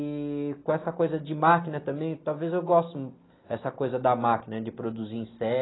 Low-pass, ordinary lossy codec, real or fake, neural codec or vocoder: 7.2 kHz; AAC, 16 kbps; real; none